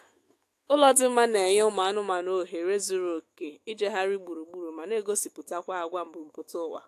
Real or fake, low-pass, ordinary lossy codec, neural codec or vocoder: fake; 14.4 kHz; AAC, 64 kbps; autoencoder, 48 kHz, 128 numbers a frame, DAC-VAE, trained on Japanese speech